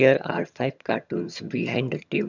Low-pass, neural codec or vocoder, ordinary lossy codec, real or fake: 7.2 kHz; vocoder, 22.05 kHz, 80 mel bands, HiFi-GAN; none; fake